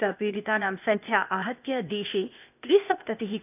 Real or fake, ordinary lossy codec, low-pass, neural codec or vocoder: fake; none; 3.6 kHz; codec, 16 kHz, 0.8 kbps, ZipCodec